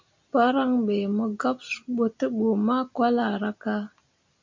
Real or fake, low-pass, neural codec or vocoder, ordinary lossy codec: real; 7.2 kHz; none; MP3, 64 kbps